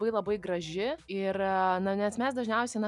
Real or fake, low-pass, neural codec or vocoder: real; 10.8 kHz; none